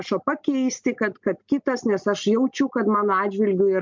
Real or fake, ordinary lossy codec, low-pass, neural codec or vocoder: real; MP3, 64 kbps; 7.2 kHz; none